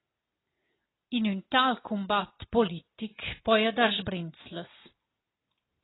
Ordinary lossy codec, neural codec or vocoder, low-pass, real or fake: AAC, 16 kbps; none; 7.2 kHz; real